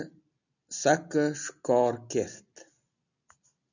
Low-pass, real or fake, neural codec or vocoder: 7.2 kHz; real; none